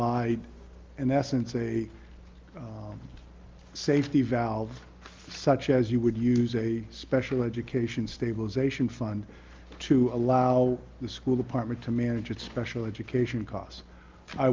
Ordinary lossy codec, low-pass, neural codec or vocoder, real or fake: Opus, 32 kbps; 7.2 kHz; none; real